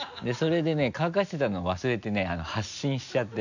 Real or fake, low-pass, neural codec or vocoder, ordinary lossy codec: real; 7.2 kHz; none; none